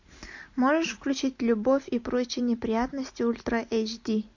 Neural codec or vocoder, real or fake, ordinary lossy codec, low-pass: none; real; MP3, 48 kbps; 7.2 kHz